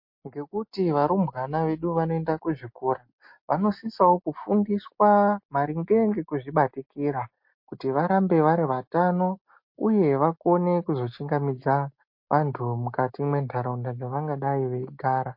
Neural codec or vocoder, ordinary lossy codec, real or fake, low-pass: none; MP3, 32 kbps; real; 5.4 kHz